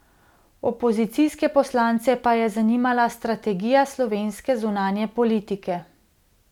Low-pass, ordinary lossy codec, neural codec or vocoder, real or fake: 19.8 kHz; none; none; real